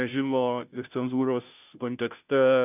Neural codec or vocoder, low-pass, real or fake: codec, 16 kHz, 0.5 kbps, FunCodec, trained on Chinese and English, 25 frames a second; 3.6 kHz; fake